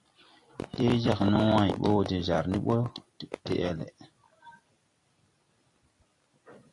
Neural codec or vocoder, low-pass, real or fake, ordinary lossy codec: vocoder, 44.1 kHz, 128 mel bands every 256 samples, BigVGAN v2; 10.8 kHz; fake; AAC, 48 kbps